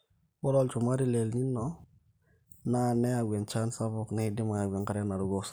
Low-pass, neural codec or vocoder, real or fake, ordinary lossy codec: none; none; real; none